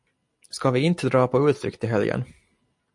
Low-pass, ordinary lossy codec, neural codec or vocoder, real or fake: 10.8 kHz; MP3, 48 kbps; none; real